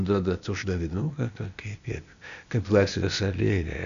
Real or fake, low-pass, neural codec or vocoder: fake; 7.2 kHz; codec, 16 kHz, 0.8 kbps, ZipCodec